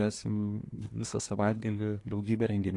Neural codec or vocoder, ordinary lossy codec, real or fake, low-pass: codec, 24 kHz, 1 kbps, SNAC; AAC, 48 kbps; fake; 10.8 kHz